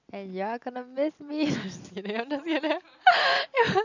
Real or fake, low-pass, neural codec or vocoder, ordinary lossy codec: real; 7.2 kHz; none; none